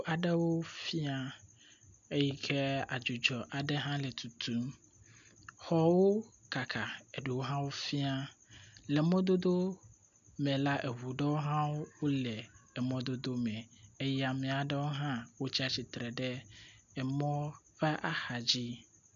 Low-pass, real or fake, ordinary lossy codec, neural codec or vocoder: 7.2 kHz; real; MP3, 96 kbps; none